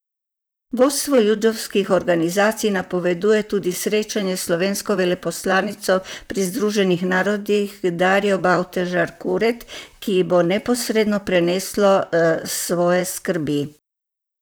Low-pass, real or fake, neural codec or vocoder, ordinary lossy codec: none; fake; vocoder, 44.1 kHz, 128 mel bands, Pupu-Vocoder; none